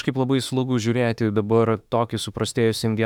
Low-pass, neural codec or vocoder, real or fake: 19.8 kHz; autoencoder, 48 kHz, 32 numbers a frame, DAC-VAE, trained on Japanese speech; fake